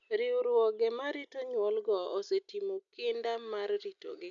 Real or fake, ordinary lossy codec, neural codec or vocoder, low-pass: real; none; none; 7.2 kHz